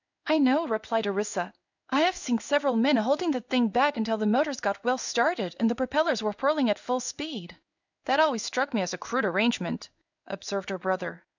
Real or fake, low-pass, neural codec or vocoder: fake; 7.2 kHz; codec, 16 kHz in and 24 kHz out, 1 kbps, XY-Tokenizer